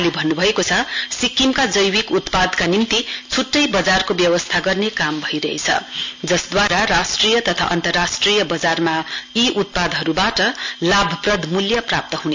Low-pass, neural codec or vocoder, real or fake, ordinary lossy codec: 7.2 kHz; none; real; MP3, 64 kbps